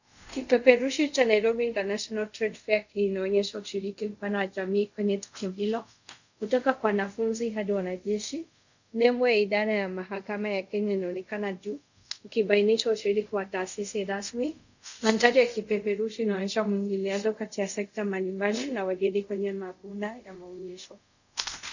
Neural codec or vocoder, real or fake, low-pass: codec, 24 kHz, 0.5 kbps, DualCodec; fake; 7.2 kHz